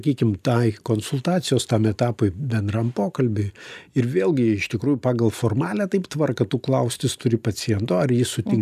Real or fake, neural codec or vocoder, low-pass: real; none; 14.4 kHz